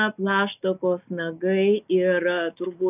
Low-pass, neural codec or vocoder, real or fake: 3.6 kHz; none; real